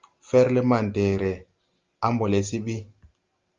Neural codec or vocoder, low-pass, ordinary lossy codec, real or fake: none; 7.2 kHz; Opus, 32 kbps; real